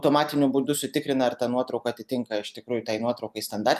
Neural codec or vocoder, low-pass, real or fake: none; 14.4 kHz; real